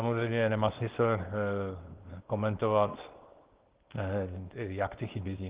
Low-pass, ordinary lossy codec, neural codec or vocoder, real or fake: 3.6 kHz; Opus, 16 kbps; codec, 24 kHz, 0.9 kbps, WavTokenizer, small release; fake